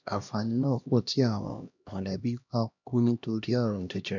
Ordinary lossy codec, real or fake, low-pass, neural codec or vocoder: none; fake; 7.2 kHz; codec, 16 kHz, 1 kbps, X-Codec, HuBERT features, trained on LibriSpeech